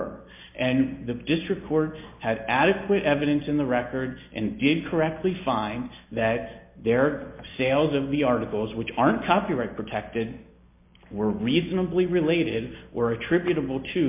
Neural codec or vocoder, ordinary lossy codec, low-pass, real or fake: none; MP3, 32 kbps; 3.6 kHz; real